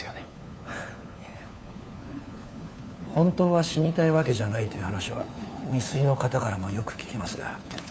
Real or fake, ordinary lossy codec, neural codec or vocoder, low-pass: fake; none; codec, 16 kHz, 4 kbps, FunCodec, trained on LibriTTS, 50 frames a second; none